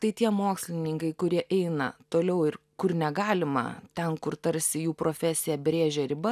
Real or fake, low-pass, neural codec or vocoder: real; 14.4 kHz; none